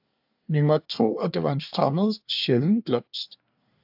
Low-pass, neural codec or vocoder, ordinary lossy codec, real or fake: 5.4 kHz; codec, 24 kHz, 1 kbps, SNAC; AAC, 48 kbps; fake